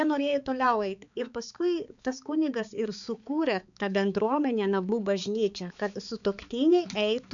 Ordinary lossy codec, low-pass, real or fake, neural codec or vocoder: MP3, 96 kbps; 7.2 kHz; fake; codec, 16 kHz, 4 kbps, X-Codec, HuBERT features, trained on balanced general audio